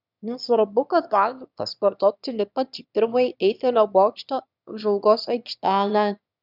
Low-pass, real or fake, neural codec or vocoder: 5.4 kHz; fake; autoencoder, 22.05 kHz, a latent of 192 numbers a frame, VITS, trained on one speaker